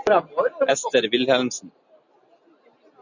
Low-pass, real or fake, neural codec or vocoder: 7.2 kHz; real; none